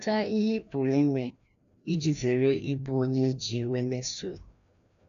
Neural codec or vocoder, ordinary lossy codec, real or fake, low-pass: codec, 16 kHz, 1 kbps, FreqCodec, larger model; none; fake; 7.2 kHz